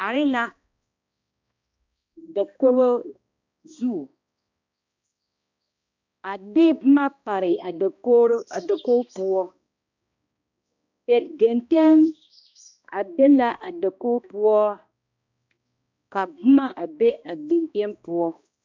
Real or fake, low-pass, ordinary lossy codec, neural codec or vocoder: fake; 7.2 kHz; MP3, 64 kbps; codec, 16 kHz, 1 kbps, X-Codec, HuBERT features, trained on balanced general audio